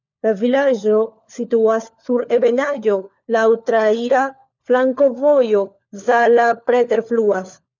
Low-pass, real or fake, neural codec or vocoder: 7.2 kHz; fake; codec, 16 kHz, 4 kbps, FunCodec, trained on LibriTTS, 50 frames a second